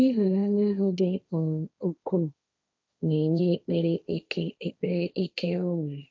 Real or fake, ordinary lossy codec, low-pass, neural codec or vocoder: fake; none; none; codec, 16 kHz, 1.1 kbps, Voila-Tokenizer